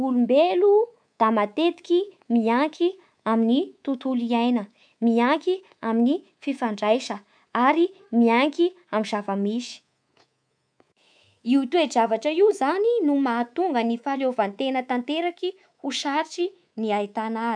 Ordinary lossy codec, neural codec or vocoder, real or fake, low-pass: none; codec, 24 kHz, 3.1 kbps, DualCodec; fake; 9.9 kHz